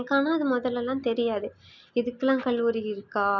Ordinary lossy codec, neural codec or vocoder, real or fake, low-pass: none; none; real; 7.2 kHz